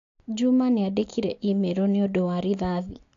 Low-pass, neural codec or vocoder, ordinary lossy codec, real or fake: 7.2 kHz; none; AAC, 48 kbps; real